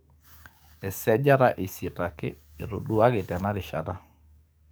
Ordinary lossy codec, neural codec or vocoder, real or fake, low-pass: none; codec, 44.1 kHz, 7.8 kbps, DAC; fake; none